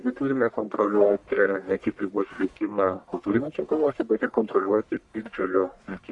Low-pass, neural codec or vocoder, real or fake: 10.8 kHz; codec, 44.1 kHz, 1.7 kbps, Pupu-Codec; fake